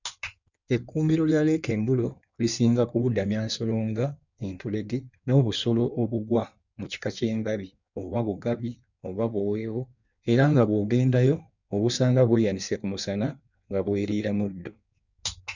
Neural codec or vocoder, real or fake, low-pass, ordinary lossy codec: codec, 16 kHz in and 24 kHz out, 1.1 kbps, FireRedTTS-2 codec; fake; 7.2 kHz; none